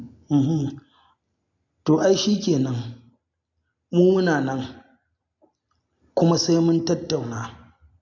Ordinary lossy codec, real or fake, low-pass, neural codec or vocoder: MP3, 64 kbps; real; 7.2 kHz; none